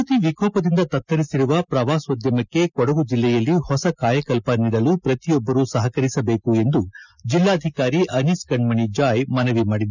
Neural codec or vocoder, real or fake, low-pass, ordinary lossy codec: none; real; 7.2 kHz; none